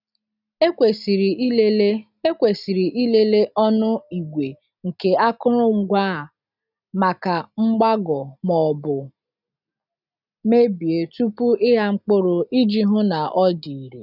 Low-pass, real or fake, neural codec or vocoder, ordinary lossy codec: 5.4 kHz; real; none; none